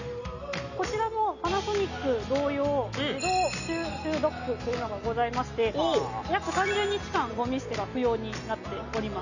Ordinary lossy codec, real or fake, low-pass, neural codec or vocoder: none; real; 7.2 kHz; none